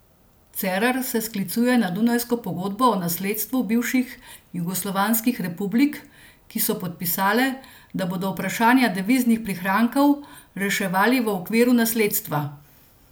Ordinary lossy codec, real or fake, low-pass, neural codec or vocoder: none; real; none; none